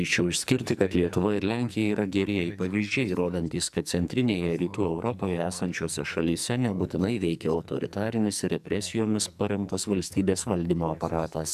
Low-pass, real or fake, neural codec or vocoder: 14.4 kHz; fake; codec, 44.1 kHz, 2.6 kbps, SNAC